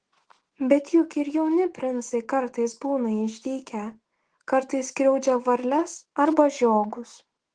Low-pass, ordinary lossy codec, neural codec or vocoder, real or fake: 9.9 kHz; Opus, 16 kbps; autoencoder, 48 kHz, 128 numbers a frame, DAC-VAE, trained on Japanese speech; fake